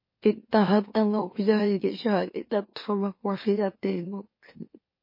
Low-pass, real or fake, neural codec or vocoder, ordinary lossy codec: 5.4 kHz; fake; autoencoder, 44.1 kHz, a latent of 192 numbers a frame, MeloTTS; MP3, 24 kbps